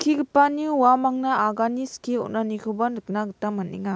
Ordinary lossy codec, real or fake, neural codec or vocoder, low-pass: none; real; none; none